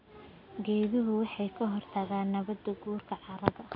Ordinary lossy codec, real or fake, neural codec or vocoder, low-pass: none; real; none; 5.4 kHz